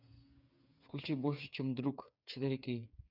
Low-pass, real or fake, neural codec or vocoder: 5.4 kHz; fake; codec, 16 kHz, 8 kbps, FreqCodec, smaller model